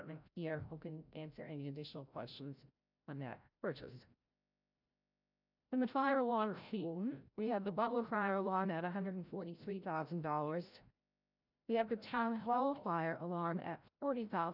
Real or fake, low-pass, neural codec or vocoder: fake; 5.4 kHz; codec, 16 kHz, 0.5 kbps, FreqCodec, larger model